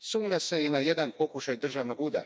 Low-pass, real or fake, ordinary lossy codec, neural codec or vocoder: none; fake; none; codec, 16 kHz, 2 kbps, FreqCodec, smaller model